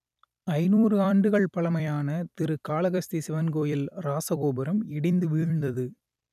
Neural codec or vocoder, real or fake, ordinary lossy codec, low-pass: vocoder, 44.1 kHz, 128 mel bands every 256 samples, BigVGAN v2; fake; none; 14.4 kHz